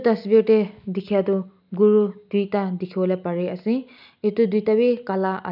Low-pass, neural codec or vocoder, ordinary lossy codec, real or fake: 5.4 kHz; none; none; real